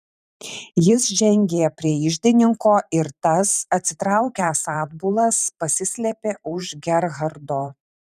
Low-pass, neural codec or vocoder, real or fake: 14.4 kHz; vocoder, 44.1 kHz, 128 mel bands every 512 samples, BigVGAN v2; fake